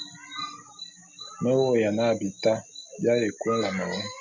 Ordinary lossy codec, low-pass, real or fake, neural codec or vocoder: MP3, 64 kbps; 7.2 kHz; real; none